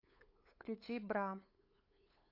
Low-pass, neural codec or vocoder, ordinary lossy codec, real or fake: 5.4 kHz; codec, 16 kHz, 8 kbps, FunCodec, trained on LibriTTS, 25 frames a second; AAC, 48 kbps; fake